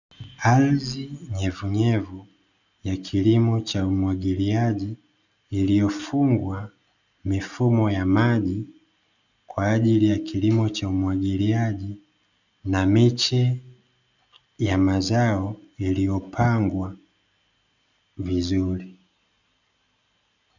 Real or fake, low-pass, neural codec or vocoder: real; 7.2 kHz; none